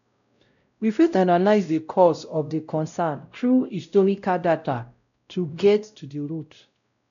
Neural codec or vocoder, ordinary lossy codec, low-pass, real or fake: codec, 16 kHz, 0.5 kbps, X-Codec, WavLM features, trained on Multilingual LibriSpeech; none; 7.2 kHz; fake